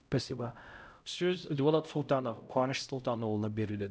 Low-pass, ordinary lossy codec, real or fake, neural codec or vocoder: none; none; fake; codec, 16 kHz, 0.5 kbps, X-Codec, HuBERT features, trained on LibriSpeech